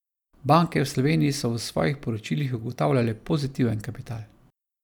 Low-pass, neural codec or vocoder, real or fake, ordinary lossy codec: 19.8 kHz; none; real; none